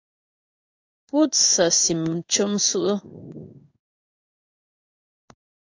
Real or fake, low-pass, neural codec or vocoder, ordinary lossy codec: fake; 7.2 kHz; codec, 16 kHz in and 24 kHz out, 1 kbps, XY-Tokenizer; AAC, 48 kbps